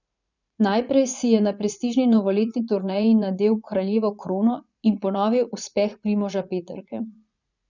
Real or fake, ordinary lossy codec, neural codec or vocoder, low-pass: fake; none; vocoder, 44.1 kHz, 80 mel bands, Vocos; 7.2 kHz